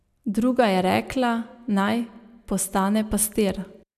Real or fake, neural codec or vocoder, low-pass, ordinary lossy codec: real; none; 14.4 kHz; none